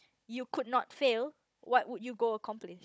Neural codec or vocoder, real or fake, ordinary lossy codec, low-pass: codec, 16 kHz, 16 kbps, FunCodec, trained on Chinese and English, 50 frames a second; fake; none; none